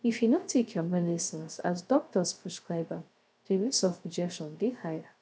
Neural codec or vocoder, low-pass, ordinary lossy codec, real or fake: codec, 16 kHz, 0.3 kbps, FocalCodec; none; none; fake